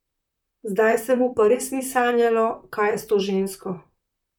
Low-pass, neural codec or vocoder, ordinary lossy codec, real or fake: 19.8 kHz; vocoder, 44.1 kHz, 128 mel bands, Pupu-Vocoder; none; fake